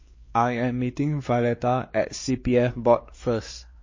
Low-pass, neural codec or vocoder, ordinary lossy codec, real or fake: 7.2 kHz; codec, 16 kHz, 2 kbps, X-Codec, WavLM features, trained on Multilingual LibriSpeech; MP3, 32 kbps; fake